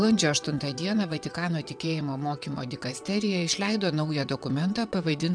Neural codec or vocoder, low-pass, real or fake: vocoder, 22.05 kHz, 80 mel bands, WaveNeXt; 9.9 kHz; fake